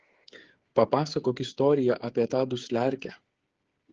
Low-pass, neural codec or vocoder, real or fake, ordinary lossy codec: 7.2 kHz; codec, 16 kHz, 8 kbps, FreqCodec, smaller model; fake; Opus, 16 kbps